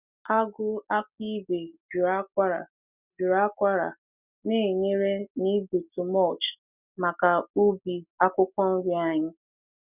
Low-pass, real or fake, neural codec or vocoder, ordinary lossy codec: 3.6 kHz; real; none; none